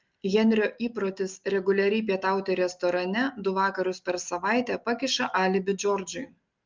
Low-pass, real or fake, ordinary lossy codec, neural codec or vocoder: 7.2 kHz; real; Opus, 24 kbps; none